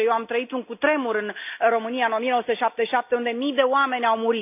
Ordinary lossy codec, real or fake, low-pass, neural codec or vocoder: none; real; 3.6 kHz; none